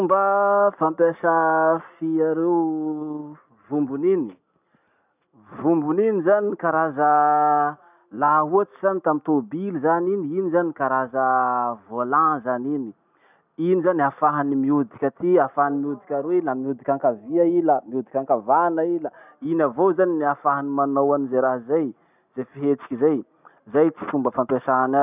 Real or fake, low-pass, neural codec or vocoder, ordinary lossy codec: real; 3.6 kHz; none; none